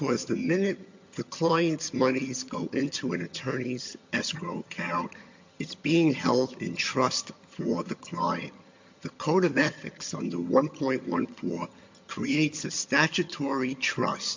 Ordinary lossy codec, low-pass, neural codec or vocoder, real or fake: MP3, 48 kbps; 7.2 kHz; vocoder, 22.05 kHz, 80 mel bands, HiFi-GAN; fake